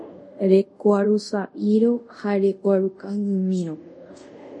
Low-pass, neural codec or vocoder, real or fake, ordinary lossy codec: 10.8 kHz; codec, 24 kHz, 0.5 kbps, DualCodec; fake; MP3, 48 kbps